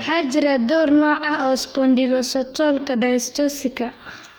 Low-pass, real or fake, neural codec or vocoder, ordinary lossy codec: none; fake; codec, 44.1 kHz, 2.6 kbps, DAC; none